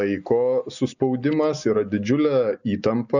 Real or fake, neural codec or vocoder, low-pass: real; none; 7.2 kHz